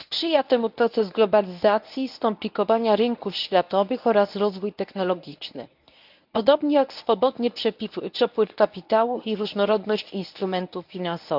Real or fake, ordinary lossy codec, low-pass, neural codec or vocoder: fake; none; 5.4 kHz; codec, 24 kHz, 0.9 kbps, WavTokenizer, medium speech release version 1